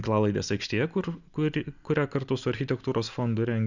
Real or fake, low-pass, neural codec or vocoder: real; 7.2 kHz; none